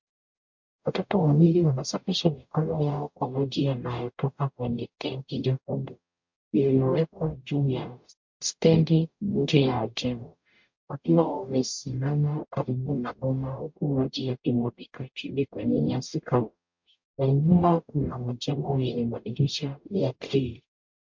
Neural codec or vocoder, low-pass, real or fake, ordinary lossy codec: codec, 44.1 kHz, 0.9 kbps, DAC; 7.2 kHz; fake; MP3, 48 kbps